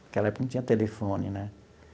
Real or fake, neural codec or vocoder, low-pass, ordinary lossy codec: real; none; none; none